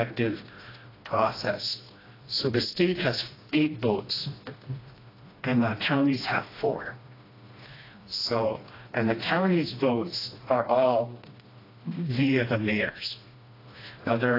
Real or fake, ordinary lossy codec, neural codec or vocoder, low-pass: fake; AAC, 24 kbps; codec, 16 kHz, 1 kbps, FreqCodec, smaller model; 5.4 kHz